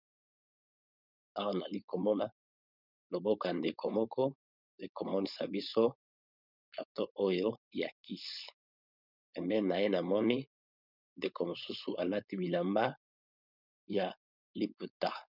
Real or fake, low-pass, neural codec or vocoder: fake; 5.4 kHz; codec, 16 kHz, 4.8 kbps, FACodec